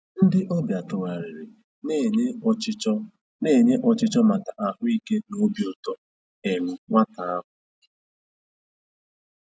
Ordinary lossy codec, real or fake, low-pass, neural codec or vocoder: none; real; none; none